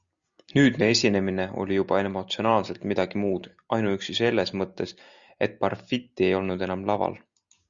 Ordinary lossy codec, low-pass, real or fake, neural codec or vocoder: Opus, 64 kbps; 7.2 kHz; real; none